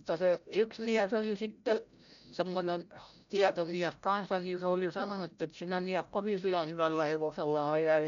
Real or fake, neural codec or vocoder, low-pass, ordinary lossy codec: fake; codec, 16 kHz, 0.5 kbps, FreqCodec, larger model; 7.2 kHz; none